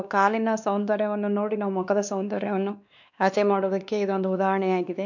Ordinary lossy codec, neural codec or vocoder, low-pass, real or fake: none; codec, 16 kHz, 2 kbps, X-Codec, WavLM features, trained on Multilingual LibriSpeech; 7.2 kHz; fake